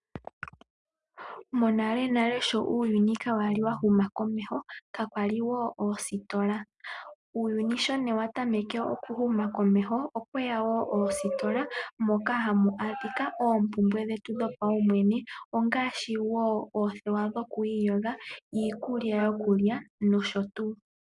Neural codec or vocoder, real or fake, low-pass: none; real; 10.8 kHz